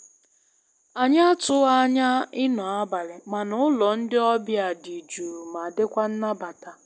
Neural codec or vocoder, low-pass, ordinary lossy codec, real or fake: none; none; none; real